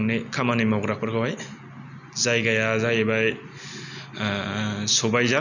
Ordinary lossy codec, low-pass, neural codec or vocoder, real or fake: none; 7.2 kHz; none; real